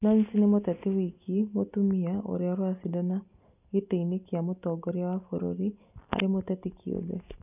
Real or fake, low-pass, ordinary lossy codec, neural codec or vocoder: real; 3.6 kHz; none; none